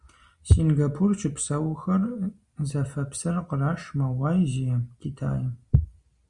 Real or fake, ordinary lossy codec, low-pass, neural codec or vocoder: real; Opus, 64 kbps; 10.8 kHz; none